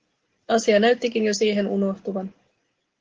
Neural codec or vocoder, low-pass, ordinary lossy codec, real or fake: none; 7.2 kHz; Opus, 16 kbps; real